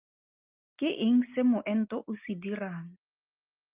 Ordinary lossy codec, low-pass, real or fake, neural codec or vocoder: Opus, 24 kbps; 3.6 kHz; real; none